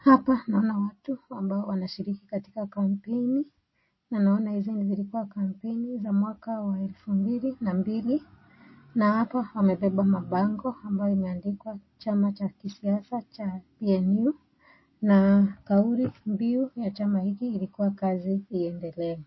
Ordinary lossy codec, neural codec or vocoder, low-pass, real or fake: MP3, 24 kbps; none; 7.2 kHz; real